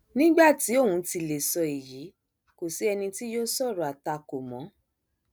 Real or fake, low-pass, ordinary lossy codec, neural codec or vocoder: real; none; none; none